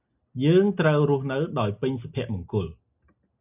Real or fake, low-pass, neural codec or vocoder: real; 3.6 kHz; none